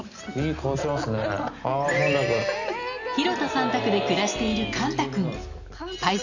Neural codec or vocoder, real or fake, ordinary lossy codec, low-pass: none; real; none; 7.2 kHz